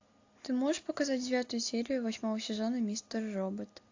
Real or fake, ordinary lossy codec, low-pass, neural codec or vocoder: real; AAC, 48 kbps; 7.2 kHz; none